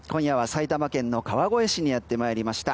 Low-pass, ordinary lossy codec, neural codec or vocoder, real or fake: none; none; none; real